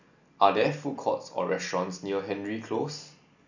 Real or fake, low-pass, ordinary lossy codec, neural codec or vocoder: real; 7.2 kHz; none; none